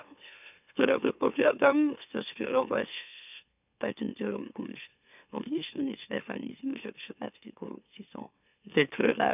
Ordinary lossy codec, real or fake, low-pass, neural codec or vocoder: none; fake; 3.6 kHz; autoencoder, 44.1 kHz, a latent of 192 numbers a frame, MeloTTS